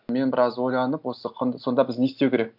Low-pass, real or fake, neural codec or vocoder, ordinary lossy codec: 5.4 kHz; real; none; none